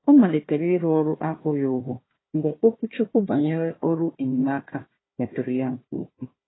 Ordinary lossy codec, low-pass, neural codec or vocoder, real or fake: AAC, 16 kbps; 7.2 kHz; codec, 16 kHz, 1 kbps, FunCodec, trained on Chinese and English, 50 frames a second; fake